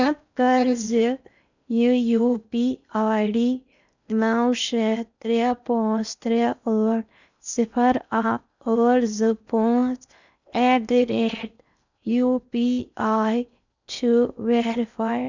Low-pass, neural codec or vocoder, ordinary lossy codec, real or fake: 7.2 kHz; codec, 16 kHz in and 24 kHz out, 0.6 kbps, FocalCodec, streaming, 4096 codes; none; fake